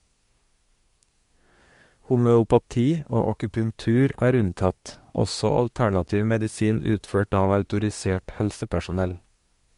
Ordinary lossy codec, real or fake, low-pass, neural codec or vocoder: MP3, 64 kbps; fake; 10.8 kHz; codec, 24 kHz, 1 kbps, SNAC